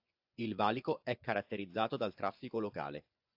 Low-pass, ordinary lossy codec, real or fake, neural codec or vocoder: 5.4 kHz; AAC, 48 kbps; fake; vocoder, 24 kHz, 100 mel bands, Vocos